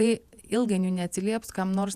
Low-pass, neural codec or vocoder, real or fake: 14.4 kHz; vocoder, 48 kHz, 128 mel bands, Vocos; fake